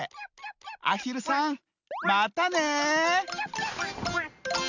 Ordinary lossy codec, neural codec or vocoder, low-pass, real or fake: none; none; 7.2 kHz; real